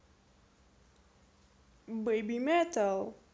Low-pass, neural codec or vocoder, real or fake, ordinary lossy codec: none; none; real; none